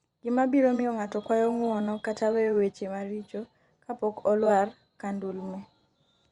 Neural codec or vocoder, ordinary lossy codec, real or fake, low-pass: vocoder, 24 kHz, 100 mel bands, Vocos; Opus, 64 kbps; fake; 10.8 kHz